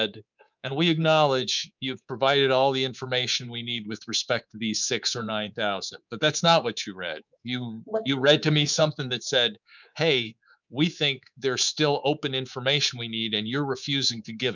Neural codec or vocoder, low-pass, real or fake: codec, 24 kHz, 3.1 kbps, DualCodec; 7.2 kHz; fake